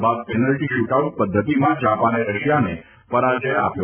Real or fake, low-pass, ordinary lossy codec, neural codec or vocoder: real; 3.6 kHz; none; none